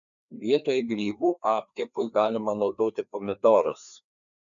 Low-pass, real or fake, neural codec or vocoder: 7.2 kHz; fake; codec, 16 kHz, 2 kbps, FreqCodec, larger model